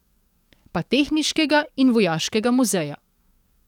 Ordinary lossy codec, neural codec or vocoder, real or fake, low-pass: none; codec, 44.1 kHz, 7.8 kbps, DAC; fake; 19.8 kHz